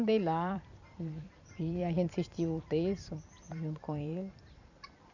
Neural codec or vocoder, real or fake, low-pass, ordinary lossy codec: vocoder, 44.1 kHz, 128 mel bands every 256 samples, BigVGAN v2; fake; 7.2 kHz; none